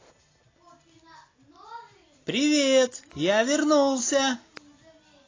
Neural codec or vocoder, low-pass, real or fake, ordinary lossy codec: none; 7.2 kHz; real; AAC, 32 kbps